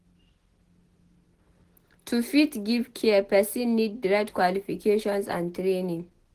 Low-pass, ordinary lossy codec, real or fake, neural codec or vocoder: 14.4 kHz; Opus, 16 kbps; real; none